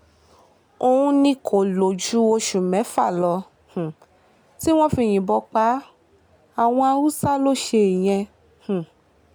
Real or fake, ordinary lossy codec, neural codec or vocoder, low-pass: real; none; none; none